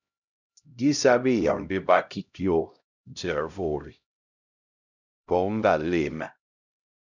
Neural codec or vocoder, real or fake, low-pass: codec, 16 kHz, 0.5 kbps, X-Codec, HuBERT features, trained on LibriSpeech; fake; 7.2 kHz